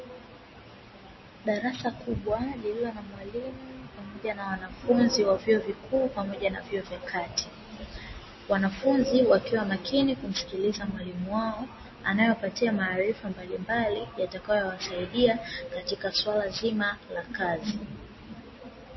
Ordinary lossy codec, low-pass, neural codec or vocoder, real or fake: MP3, 24 kbps; 7.2 kHz; none; real